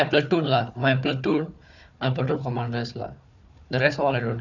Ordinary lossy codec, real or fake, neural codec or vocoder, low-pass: none; fake; codec, 16 kHz, 4 kbps, FunCodec, trained on Chinese and English, 50 frames a second; 7.2 kHz